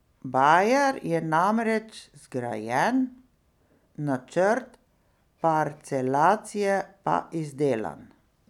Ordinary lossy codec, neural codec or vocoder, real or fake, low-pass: none; none; real; 19.8 kHz